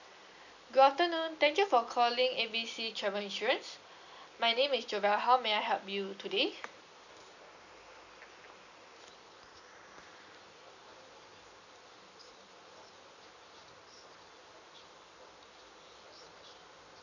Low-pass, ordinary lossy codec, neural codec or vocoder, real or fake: 7.2 kHz; none; none; real